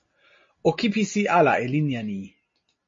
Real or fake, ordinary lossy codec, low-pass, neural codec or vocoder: real; MP3, 32 kbps; 7.2 kHz; none